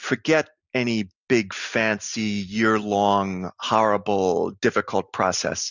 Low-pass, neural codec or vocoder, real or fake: 7.2 kHz; none; real